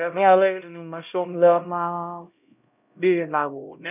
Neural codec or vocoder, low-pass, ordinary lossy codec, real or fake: codec, 16 kHz, 0.5 kbps, X-Codec, HuBERT features, trained on LibriSpeech; 3.6 kHz; none; fake